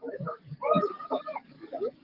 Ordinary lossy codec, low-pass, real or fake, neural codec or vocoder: Opus, 24 kbps; 5.4 kHz; fake; codec, 44.1 kHz, 7.8 kbps, DAC